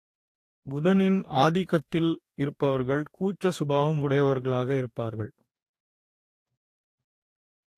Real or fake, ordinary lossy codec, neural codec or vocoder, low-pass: fake; AAC, 64 kbps; codec, 44.1 kHz, 2.6 kbps, DAC; 14.4 kHz